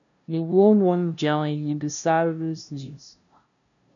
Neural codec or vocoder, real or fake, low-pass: codec, 16 kHz, 0.5 kbps, FunCodec, trained on LibriTTS, 25 frames a second; fake; 7.2 kHz